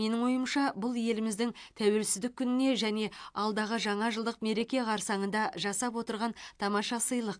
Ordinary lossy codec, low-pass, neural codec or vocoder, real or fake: AAC, 64 kbps; 9.9 kHz; none; real